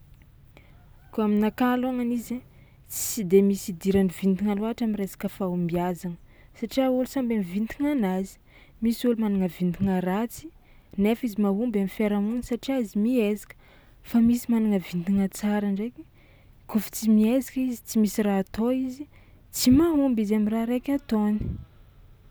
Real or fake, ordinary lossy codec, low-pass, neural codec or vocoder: real; none; none; none